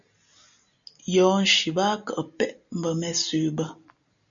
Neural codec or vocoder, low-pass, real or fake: none; 7.2 kHz; real